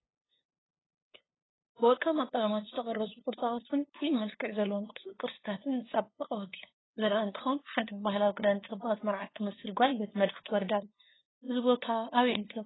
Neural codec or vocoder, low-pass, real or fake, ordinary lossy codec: codec, 16 kHz, 2 kbps, FunCodec, trained on LibriTTS, 25 frames a second; 7.2 kHz; fake; AAC, 16 kbps